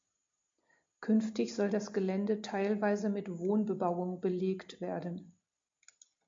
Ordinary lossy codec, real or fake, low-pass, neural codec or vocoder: MP3, 48 kbps; real; 7.2 kHz; none